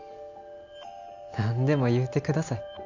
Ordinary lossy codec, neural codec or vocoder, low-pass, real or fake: none; none; 7.2 kHz; real